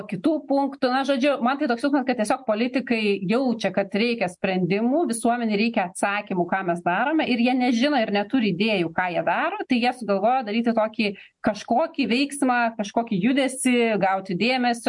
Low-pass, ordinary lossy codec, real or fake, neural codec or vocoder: 10.8 kHz; MP3, 64 kbps; real; none